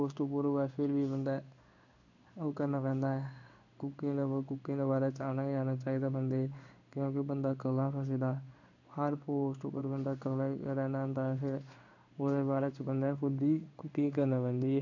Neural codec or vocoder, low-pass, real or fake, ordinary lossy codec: codec, 16 kHz in and 24 kHz out, 1 kbps, XY-Tokenizer; 7.2 kHz; fake; none